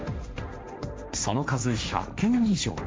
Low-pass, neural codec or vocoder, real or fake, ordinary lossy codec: none; codec, 16 kHz, 1.1 kbps, Voila-Tokenizer; fake; none